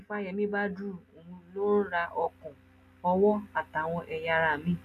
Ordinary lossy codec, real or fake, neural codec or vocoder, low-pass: AAC, 96 kbps; real; none; 14.4 kHz